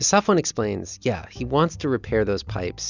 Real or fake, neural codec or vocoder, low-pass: real; none; 7.2 kHz